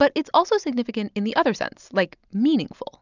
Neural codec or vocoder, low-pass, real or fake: none; 7.2 kHz; real